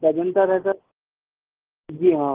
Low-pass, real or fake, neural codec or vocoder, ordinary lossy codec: 3.6 kHz; real; none; Opus, 16 kbps